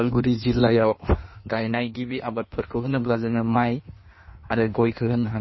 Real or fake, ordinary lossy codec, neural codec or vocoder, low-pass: fake; MP3, 24 kbps; codec, 16 kHz in and 24 kHz out, 1.1 kbps, FireRedTTS-2 codec; 7.2 kHz